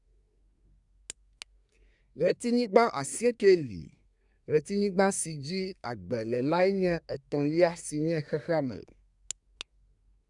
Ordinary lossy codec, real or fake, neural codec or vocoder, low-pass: none; fake; codec, 24 kHz, 1 kbps, SNAC; 10.8 kHz